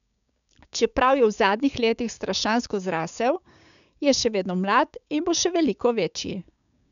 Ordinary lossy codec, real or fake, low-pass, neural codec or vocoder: none; fake; 7.2 kHz; codec, 16 kHz, 6 kbps, DAC